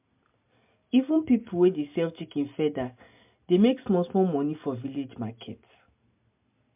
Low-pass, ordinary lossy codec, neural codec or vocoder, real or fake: 3.6 kHz; MP3, 32 kbps; none; real